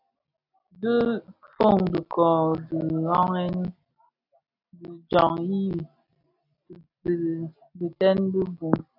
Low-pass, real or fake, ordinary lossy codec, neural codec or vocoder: 5.4 kHz; real; AAC, 48 kbps; none